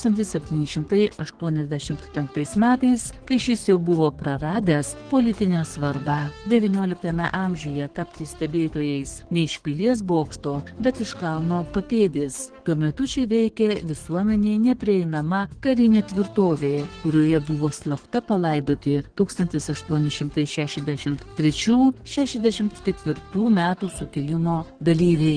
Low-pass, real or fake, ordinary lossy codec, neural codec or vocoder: 9.9 kHz; fake; Opus, 16 kbps; codec, 32 kHz, 1.9 kbps, SNAC